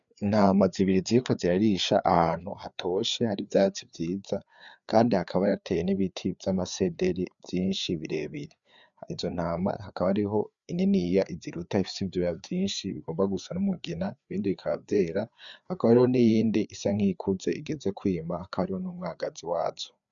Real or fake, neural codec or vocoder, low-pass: fake; codec, 16 kHz, 4 kbps, FreqCodec, larger model; 7.2 kHz